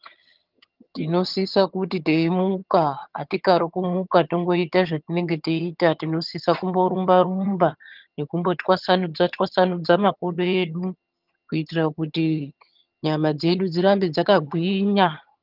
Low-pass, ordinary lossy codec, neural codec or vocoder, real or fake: 5.4 kHz; Opus, 32 kbps; vocoder, 22.05 kHz, 80 mel bands, HiFi-GAN; fake